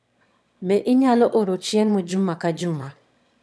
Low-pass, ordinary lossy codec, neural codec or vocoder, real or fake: none; none; autoencoder, 22.05 kHz, a latent of 192 numbers a frame, VITS, trained on one speaker; fake